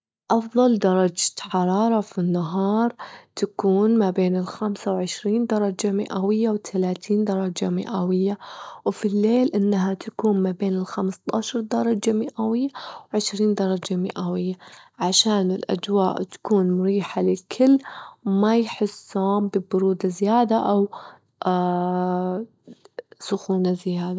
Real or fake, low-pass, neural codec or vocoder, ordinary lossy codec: real; none; none; none